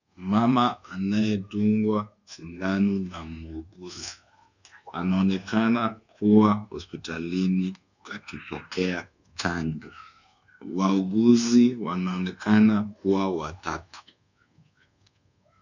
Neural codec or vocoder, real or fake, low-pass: codec, 24 kHz, 1.2 kbps, DualCodec; fake; 7.2 kHz